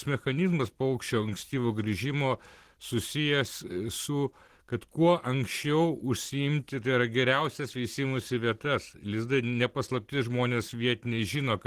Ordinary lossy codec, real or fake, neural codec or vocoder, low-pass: Opus, 16 kbps; real; none; 14.4 kHz